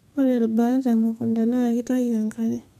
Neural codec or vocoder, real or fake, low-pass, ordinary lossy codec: codec, 32 kHz, 1.9 kbps, SNAC; fake; 14.4 kHz; none